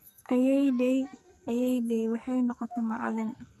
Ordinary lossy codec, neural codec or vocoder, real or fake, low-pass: none; codec, 44.1 kHz, 2.6 kbps, SNAC; fake; 14.4 kHz